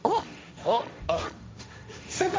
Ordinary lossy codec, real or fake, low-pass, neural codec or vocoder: none; fake; none; codec, 16 kHz, 1.1 kbps, Voila-Tokenizer